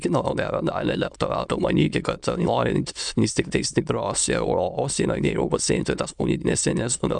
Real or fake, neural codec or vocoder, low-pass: fake; autoencoder, 22.05 kHz, a latent of 192 numbers a frame, VITS, trained on many speakers; 9.9 kHz